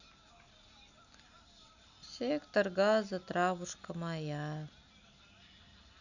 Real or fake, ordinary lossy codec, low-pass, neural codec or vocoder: real; none; 7.2 kHz; none